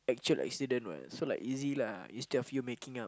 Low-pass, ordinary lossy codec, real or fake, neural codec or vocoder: none; none; real; none